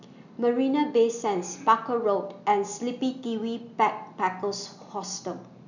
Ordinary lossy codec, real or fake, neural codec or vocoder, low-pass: none; real; none; 7.2 kHz